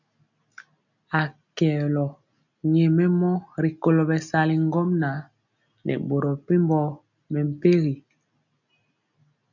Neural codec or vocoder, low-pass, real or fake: none; 7.2 kHz; real